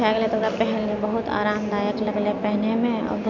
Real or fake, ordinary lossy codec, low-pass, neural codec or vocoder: real; none; 7.2 kHz; none